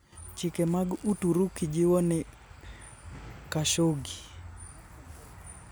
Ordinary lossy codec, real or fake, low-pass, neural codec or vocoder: none; real; none; none